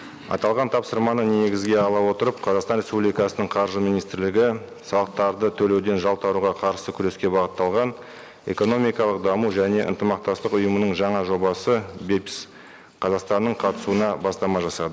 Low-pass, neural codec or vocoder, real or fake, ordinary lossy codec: none; none; real; none